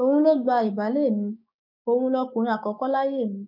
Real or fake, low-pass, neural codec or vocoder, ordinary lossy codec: fake; 5.4 kHz; codec, 16 kHz, 6 kbps, DAC; none